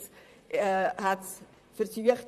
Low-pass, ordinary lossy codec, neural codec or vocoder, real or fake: 14.4 kHz; none; vocoder, 44.1 kHz, 128 mel bands, Pupu-Vocoder; fake